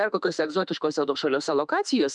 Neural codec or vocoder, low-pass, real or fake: autoencoder, 48 kHz, 32 numbers a frame, DAC-VAE, trained on Japanese speech; 10.8 kHz; fake